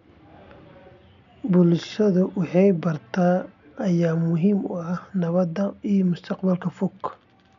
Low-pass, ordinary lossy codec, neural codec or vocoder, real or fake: 7.2 kHz; MP3, 64 kbps; none; real